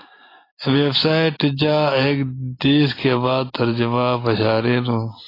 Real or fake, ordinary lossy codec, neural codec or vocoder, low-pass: real; AAC, 24 kbps; none; 5.4 kHz